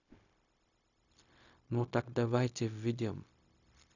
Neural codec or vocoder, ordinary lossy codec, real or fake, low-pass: codec, 16 kHz, 0.4 kbps, LongCat-Audio-Codec; none; fake; 7.2 kHz